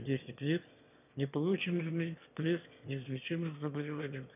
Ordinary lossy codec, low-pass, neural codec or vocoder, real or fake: none; 3.6 kHz; autoencoder, 22.05 kHz, a latent of 192 numbers a frame, VITS, trained on one speaker; fake